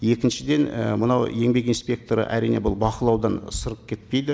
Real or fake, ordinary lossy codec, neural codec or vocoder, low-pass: real; none; none; none